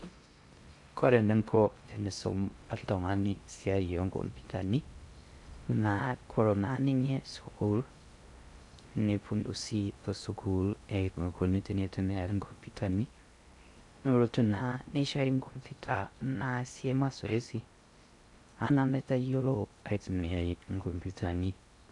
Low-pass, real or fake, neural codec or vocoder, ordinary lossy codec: 10.8 kHz; fake; codec, 16 kHz in and 24 kHz out, 0.6 kbps, FocalCodec, streaming, 4096 codes; AAC, 64 kbps